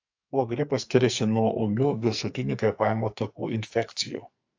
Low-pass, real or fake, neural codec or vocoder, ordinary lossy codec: 7.2 kHz; fake; codec, 44.1 kHz, 2.6 kbps, SNAC; AAC, 48 kbps